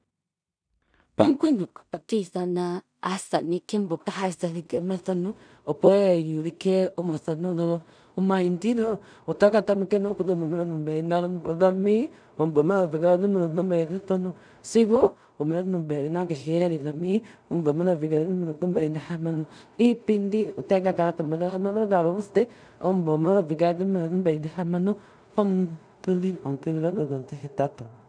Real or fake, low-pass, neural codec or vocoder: fake; 9.9 kHz; codec, 16 kHz in and 24 kHz out, 0.4 kbps, LongCat-Audio-Codec, two codebook decoder